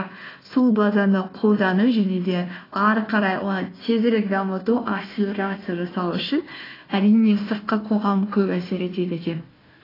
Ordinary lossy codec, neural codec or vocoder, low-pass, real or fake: AAC, 24 kbps; codec, 16 kHz, 1 kbps, FunCodec, trained on Chinese and English, 50 frames a second; 5.4 kHz; fake